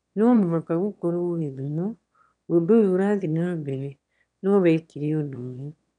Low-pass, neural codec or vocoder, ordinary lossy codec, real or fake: 9.9 kHz; autoencoder, 22.05 kHz, a latent of 192 numbers a frame, VITS, trained on one speaker; none; fake